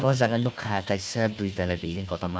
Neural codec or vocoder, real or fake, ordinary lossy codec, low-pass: codec, 16 kHz, 1 kbps, FunCodec, trained on Chinese and English, 50 frames a second; fake; none; none